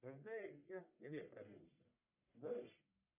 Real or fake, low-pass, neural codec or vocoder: fake; 3.6 kHz; codec, 44.1 kHz, 1.7 kbps, Pupu-Codec